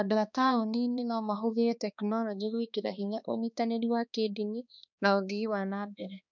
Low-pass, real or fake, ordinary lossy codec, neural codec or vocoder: 7.2 kHz; fake; none; codec, 16 kHz, 2 kbps, X-Codec, HuBERT features, trained on balanced general audio